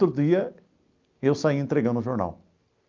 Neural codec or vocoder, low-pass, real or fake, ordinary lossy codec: none; 7.2 kHz; real; Opus, 24 kbps